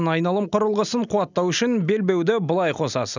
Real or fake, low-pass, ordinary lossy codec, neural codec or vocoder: real; 7.2 kHz; none; none